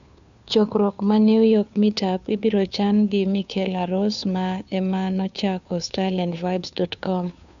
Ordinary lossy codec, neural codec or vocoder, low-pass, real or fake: none; codec, 16 kHz, 2 kbps, FunCodec, trained on Chinese and English, 25 frames a second; 7.2 kHz; fake